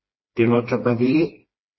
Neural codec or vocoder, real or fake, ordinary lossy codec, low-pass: codec, 16 kHz, 2 kbps, FreqCodec, smaller model; fake; MP3, 24 kbps; 7.2 kHz